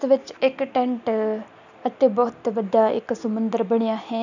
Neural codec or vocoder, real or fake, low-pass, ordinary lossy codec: none; real; 7.2 kHz; none